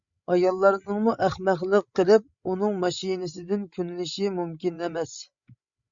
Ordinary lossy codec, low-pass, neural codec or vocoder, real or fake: Opus, 64 kbps; 7.2 kHz; codec, 16 kHz, 16 kbps, FreqCodec, larger model; fake